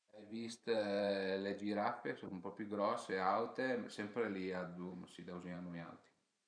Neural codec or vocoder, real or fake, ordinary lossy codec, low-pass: vocoder, 44.1 kHz, 128 mel bands every 512 samples, BigVGAN v2; fake; none; 9.9 kHz